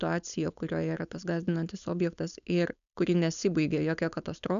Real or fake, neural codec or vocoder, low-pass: fake; codec, 16 kHz, 4.8 kbps, FACodec; 7.2 kHz